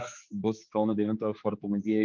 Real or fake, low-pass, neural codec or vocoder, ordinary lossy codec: fake; 7.2 kHz; codec, 16 kHz, 4 kbps, X-Codec, HuBERT features, trained on general audio; Opus, 24 kbps